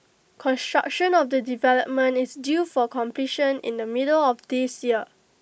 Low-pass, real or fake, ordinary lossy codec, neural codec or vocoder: none; real; none; none